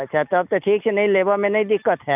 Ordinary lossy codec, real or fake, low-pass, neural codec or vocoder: none; real; 3.6 kHz; none